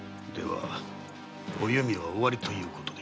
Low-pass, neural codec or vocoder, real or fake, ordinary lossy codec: none; none; real; none